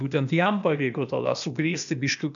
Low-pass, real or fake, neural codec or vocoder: 7.2 kHz; fake; codec, 16 kHz, 0.8 kbps, ZipCodec